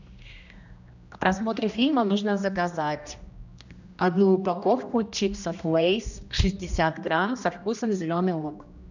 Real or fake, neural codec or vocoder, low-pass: fake; codec, 16 kHz, 1 kbps, X-Codec, HuBERT features, trained on general audio; 7.2 kHz